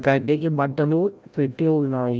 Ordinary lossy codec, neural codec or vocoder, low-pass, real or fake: none; codec, 16 kHz, 0.5 kbps, FreqCodec, larger model; none; fake